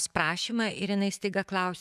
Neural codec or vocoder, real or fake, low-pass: autoencoder, 48 kHz, 128 numbers a frame, DAC-VAE, trained on Japanese speech; fake; 14.4 kHz